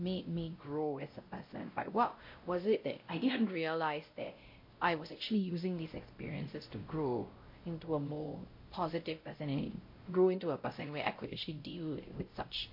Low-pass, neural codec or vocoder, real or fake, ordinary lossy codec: 5.4 kHz; codec, 16 kHz, 0.5 kbps, X-Codec, WavLM features, trained on Multilingual LibriSpeech; fake; MP3, 32 kbps